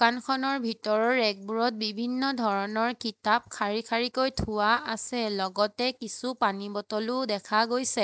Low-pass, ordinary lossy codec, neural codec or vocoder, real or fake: none; none; none; real